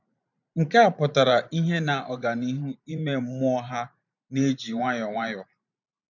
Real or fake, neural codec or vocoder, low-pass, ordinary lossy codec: fake; vocoder, 44.1 kHz, 128 mel bands every 512 samples, BigVGAN v2; 7.2 kHz; none